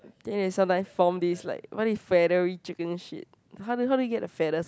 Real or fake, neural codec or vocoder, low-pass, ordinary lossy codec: real; none; none; none